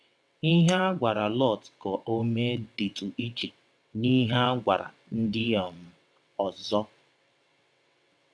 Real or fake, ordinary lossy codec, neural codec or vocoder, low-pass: fake; none; vocoder, 22.05 kHz, 80 mel bands, WaveNeXt; none